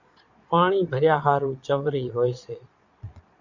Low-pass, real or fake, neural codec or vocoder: 7.2 kHz; fake; vocoder, 22.05 kHz, 80 mel bands, Vocos